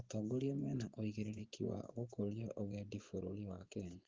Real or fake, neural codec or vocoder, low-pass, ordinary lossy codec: fake; vocoder, 24 kHz, 100 mel bands, Vocos; 7.2 kHz; Opus, 16 kbps